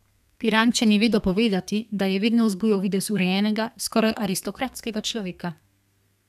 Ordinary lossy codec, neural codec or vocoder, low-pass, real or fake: none; codec, 32 kHz, 1.9 kbps, SNAC; 14.4 kHz; fake